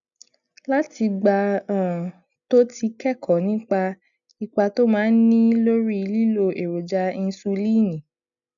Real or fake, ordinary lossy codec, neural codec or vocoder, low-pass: real; none; none; 7.2 kHz